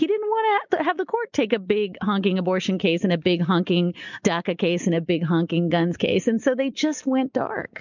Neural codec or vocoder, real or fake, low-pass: none; real; 7.2 kHz